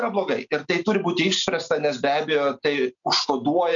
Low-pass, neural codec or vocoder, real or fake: 7.2 kHz; none; real